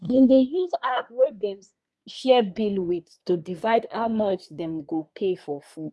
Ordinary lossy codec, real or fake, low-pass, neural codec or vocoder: none; fake; none; codec, 24 kHz, 1 kbps, SNAC